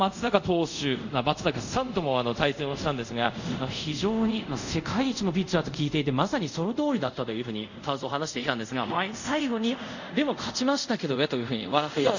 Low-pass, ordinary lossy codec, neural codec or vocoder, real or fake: 7.2 kHz; none; codec, 24 kHz, 0.5 kbps, DualCodec; fake